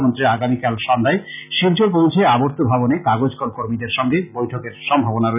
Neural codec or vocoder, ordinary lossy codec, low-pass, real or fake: none; none; 3.6 kHz; real